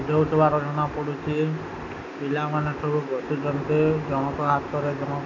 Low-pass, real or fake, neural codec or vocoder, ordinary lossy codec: 7.2 kHz; real; none; none